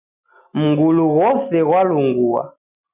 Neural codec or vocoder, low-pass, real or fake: none; 3.6 kHz; real